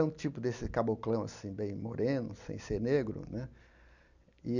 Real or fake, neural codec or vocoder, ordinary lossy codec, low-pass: real; none; none; 7.2 kHz